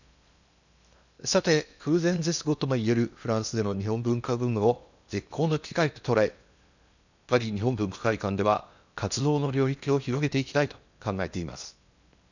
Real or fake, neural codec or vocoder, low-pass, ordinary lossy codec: fake; codec, 16 kHz in and 24 kHz out, 0.8 kbps, FocalCodec, streaming, 65536 codes; 7.2 kHz; none